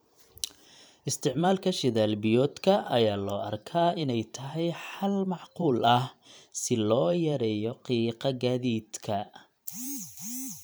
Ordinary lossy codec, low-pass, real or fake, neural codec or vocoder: none; none; fake; vocoder, 44.1 kHz, 128 mel bands every 512 samples, BigVGAN v2